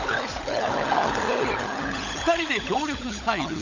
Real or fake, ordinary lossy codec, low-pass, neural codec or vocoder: fake; none; 7.2 kHz; codec, 16 kHz, 16 kbps, FunCodec, trained on LibriTTS, 50 frames a second